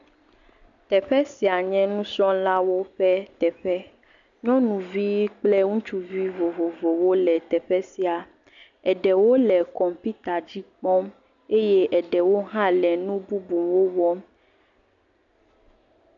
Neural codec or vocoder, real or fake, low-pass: none; real; 7.2 kHz